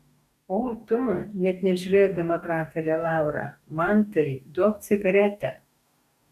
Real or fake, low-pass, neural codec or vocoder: fake; 14.4 kHz; codec, 44.1 kHz, 2.6 kbps, DAC